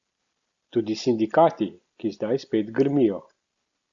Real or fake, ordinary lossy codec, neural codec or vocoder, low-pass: real; Opus, 64 kbps; none; 7.2 kHz